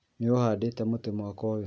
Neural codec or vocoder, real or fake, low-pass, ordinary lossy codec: none; real; none; none